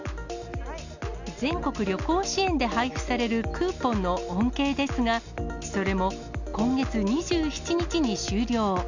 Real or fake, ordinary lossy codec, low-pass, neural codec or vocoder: real; none; 7.2 kHz; none